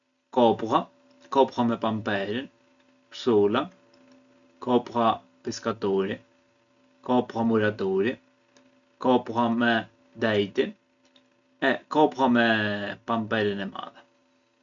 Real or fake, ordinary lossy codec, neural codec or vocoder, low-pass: real; MP3, 96 kbps; none; 7.2 kHz